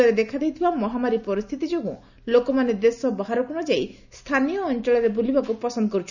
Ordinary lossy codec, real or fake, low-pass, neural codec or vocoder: none; real; 7.2 kHz; none